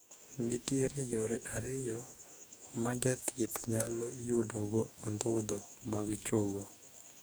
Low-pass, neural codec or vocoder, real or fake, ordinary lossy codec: none; codec, 44.1 kHz, 2.6 kbps, DAC; fake; none